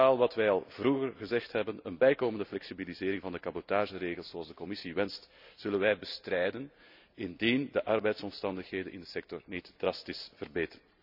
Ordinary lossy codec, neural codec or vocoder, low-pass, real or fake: none; none; 5.4 kHz; real